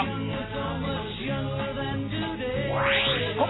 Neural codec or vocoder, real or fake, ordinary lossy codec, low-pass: none; real; AAC, 16 kbps; 7.2 kHz